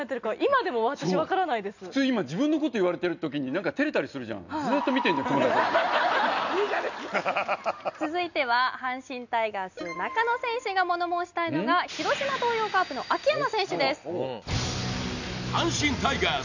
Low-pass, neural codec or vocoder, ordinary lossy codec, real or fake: 7.2 kHz; none; none; real